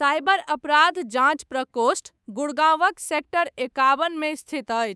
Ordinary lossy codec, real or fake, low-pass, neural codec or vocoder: none; fake; none; codec, 24 kHz, 3.1 kbps, DualCodec